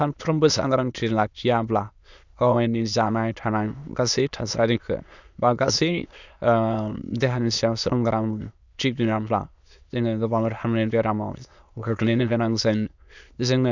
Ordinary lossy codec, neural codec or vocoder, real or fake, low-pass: none; autoencoder, 22.05 kHz, a latent of 192 numbers a frame, VITS, trained on many speakers; fake; 7.2 kHz